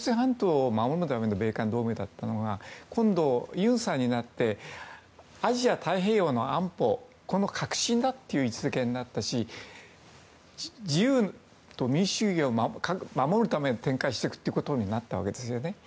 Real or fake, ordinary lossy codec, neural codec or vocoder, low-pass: real; none; none; none